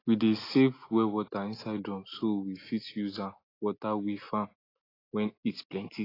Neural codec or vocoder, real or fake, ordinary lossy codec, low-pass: none; real; AAC, 24 kbps; 5.4 kHz